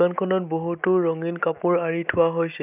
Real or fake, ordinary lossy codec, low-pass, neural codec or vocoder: real; none; 3.6 kHz; none